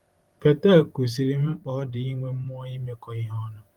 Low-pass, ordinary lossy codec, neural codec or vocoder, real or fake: 19.8 kHz; Opus, 24 kbps; vocoder, 44.1 kHz, 128 mel bands every 512 samples, BigVGAN v2; fake